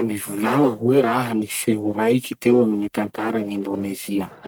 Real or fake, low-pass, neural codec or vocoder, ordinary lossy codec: fake; none; codec, 44.1 kHz, 1.7 kbps, Pupu-Codec; none